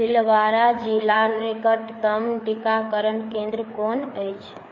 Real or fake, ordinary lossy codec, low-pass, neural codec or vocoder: fake; MP3, 32 kbps; 7.2 kHz; codec, 16 kHz, 4 kbps, FreqCodec, larger model